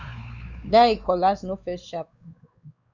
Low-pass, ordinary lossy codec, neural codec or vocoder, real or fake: 7.2 kHz; Opus, 64 kbps; codec, 16 kHz, 4 kbps, X-Codec, WavLM features, trained on Multilingual LibriSpeech; fake